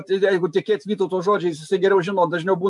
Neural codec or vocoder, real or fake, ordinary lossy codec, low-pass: none; real; MP3, 64 kbps; 10.8 kHz